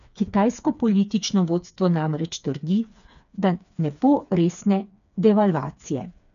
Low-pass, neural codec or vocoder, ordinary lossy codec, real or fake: 7.2 kHz; codec, 16 kHz, 4 kbps, FreqCodec, smaller model; none; fake